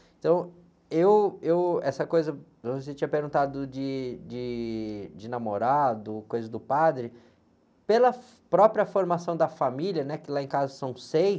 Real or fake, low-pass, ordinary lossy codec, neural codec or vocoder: real; none; none; none